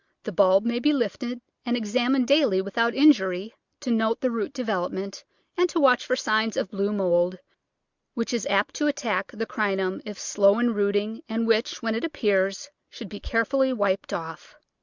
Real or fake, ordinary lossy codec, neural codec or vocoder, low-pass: real; Opus, 64 kbps; none; 7.2 kHz